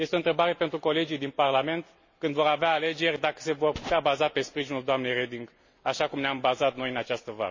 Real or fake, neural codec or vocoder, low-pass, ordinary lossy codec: real; none; 7.2 kHz; MP3, 32 kbps